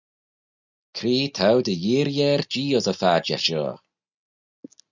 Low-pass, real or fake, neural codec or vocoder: 7.2 kHz; real; none